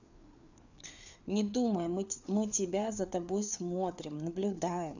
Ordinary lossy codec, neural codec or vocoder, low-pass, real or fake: none; codec, 16 kHz, 4 kbps, FreqCodec, larger model; 7.2 kHz; fake